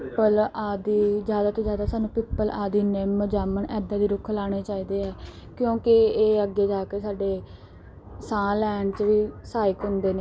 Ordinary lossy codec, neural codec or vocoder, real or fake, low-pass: none; none; real; none